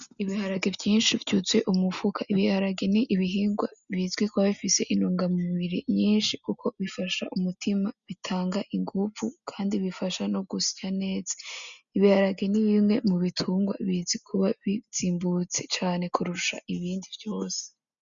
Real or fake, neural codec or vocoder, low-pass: real; none; 7.2 kHz